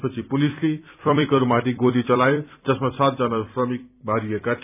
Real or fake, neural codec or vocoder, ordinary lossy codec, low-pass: fake; vocoder, 44.1 kHz, 128 mel bands every 256 samples, BigVGAN v2; none; 3.6 kHz